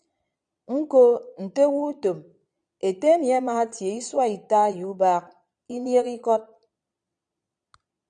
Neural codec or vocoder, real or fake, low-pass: vocoder, 22.05 kHz, 80 mel bands, Vocos; fake; 9.9 kHz